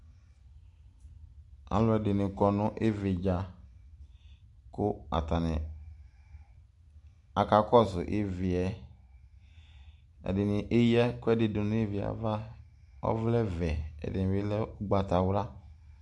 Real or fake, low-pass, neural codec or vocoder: real; 10.8 kHz; none